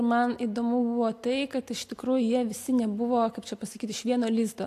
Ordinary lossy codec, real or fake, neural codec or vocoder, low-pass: AAC, 64 kbps; real; none; 14.4 kHz